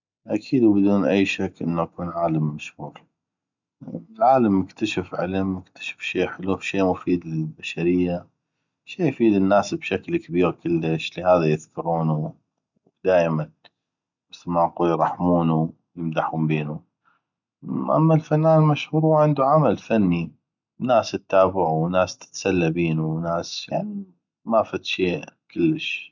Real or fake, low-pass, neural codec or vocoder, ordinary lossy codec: real; 7.2 kHz; none; none